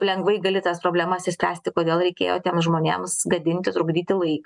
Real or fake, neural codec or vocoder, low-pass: real; none; 10.8 kHz